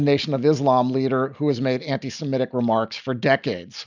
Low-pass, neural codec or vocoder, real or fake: 7.2 kHz; none; real